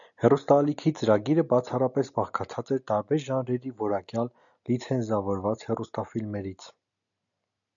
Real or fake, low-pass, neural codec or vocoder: real; 7.2 kHz; none